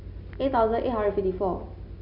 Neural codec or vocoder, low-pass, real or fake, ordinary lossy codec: none; 5.4 kHz; real; none